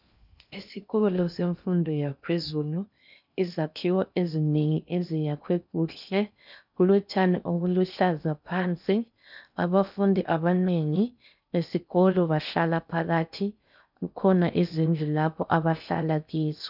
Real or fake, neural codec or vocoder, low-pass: fake; codec, 16 kHz in and 24 kHz out, 0.6 kbps, FocalCodec, streaming, 2048 codes; 5.4 kHz